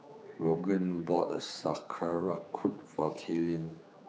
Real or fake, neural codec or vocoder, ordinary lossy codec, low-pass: fake; codec, 16 kHz, 4 kbps, X-Codec, HuBERT features, trained on general audio; none; none